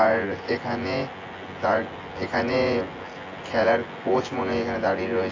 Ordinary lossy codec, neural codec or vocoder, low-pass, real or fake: AAC, 32 kbps; vocoder, 24 kHz, 100 mel bands, Vocos; 7.2 kHz; fake